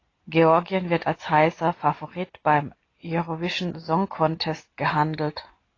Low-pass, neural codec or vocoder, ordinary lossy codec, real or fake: 7.2 kHz; none; AAC, 32 kbps; real